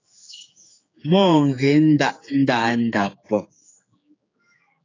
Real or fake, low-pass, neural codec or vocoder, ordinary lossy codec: fake; 7.2 kHz; codec, 16 kHz, 4 kbps, X-Codec, HuBERT features, trained on general audio; AAC, 32 kbps